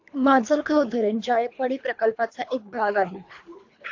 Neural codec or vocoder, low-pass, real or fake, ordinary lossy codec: codec, 24 kHz, 3 kbps, HILCodec; 7.2 kHz; fake; AAC, 48 kbps